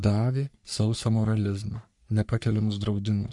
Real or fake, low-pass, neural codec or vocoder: fake; 10.8 kHz; codec, 44.1 kHz, 3.4 kbps, Pupu-Codec